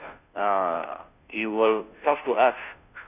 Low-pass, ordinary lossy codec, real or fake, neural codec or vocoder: 3.6 kHz; none; fake; codec, 16 kHz, 0.5 kbps, FunCodec, trained on Chinese and English, 25 frames a second